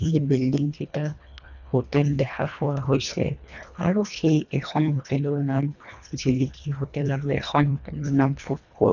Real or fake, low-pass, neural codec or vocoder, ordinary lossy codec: fake; 7.2 kHz; codec, 24 kHz, 1.5 kbps, HILCodec; none